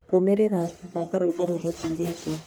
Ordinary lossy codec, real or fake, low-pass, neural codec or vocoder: none; fake; none; codec, 44.1 kHz, 1.7 kbps, Pupu-Codec